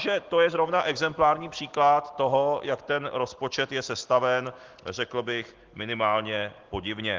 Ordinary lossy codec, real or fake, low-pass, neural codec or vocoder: Opus, 16 kbps; real; 7.2 kHz; none